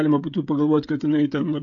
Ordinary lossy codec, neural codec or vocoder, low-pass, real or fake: AAC, 48 kbps; codec, 16 kHz, 16 kbps, FreqCodec, smaller model; 7.2 kHz; fake